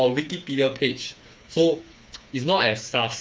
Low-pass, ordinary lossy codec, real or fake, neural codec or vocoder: none; none; fake; codec, 16 kHz, 4 kbps, FreqCodec, smaller model